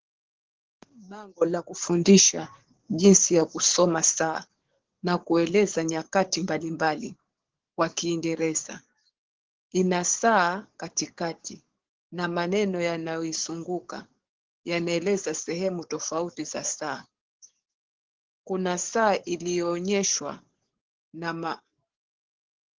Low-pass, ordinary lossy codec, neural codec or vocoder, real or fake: 7.2 kHz; Opus, 16 kbps; codec, 44.1 kHz, 7.8 kbps, DAC; fake